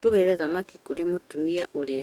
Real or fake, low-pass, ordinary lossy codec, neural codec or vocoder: fake; 19.8 kHz; none; codec, 44.1 kHz, 2.6 kbps, DAC